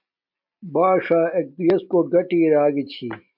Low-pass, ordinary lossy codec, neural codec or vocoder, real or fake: 5.4 kHz; MP3, 48 kbps; none; real